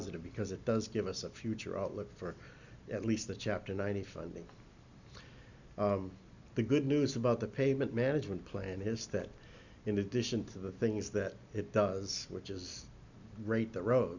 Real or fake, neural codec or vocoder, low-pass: real; none; 7.2 kHz